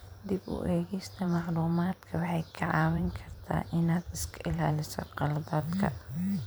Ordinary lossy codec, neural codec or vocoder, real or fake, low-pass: none; vocoder, 44.1 kHz, 128 mel bands every 512 samples, BigVGAN v2; fake; none